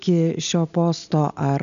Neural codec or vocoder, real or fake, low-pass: none; real; 7.2 kHz